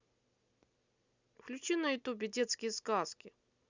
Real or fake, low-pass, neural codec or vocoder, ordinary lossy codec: real; 7.2 kHz; none; Opus, 64 kbps